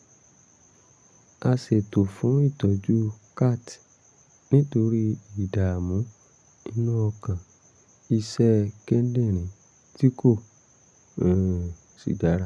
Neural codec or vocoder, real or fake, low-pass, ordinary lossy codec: none; real; none; none